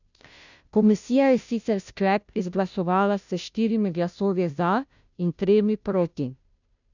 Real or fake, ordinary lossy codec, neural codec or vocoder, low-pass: fake; none; codec, 16 kHz, 0.5 kbps, FunCodec, trained on Chinese and English, 25 frames a second; 7.2 kHz